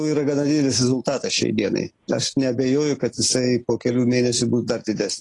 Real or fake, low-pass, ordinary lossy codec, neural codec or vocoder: real; 10.8 kHz; AAC, 32 kbps; none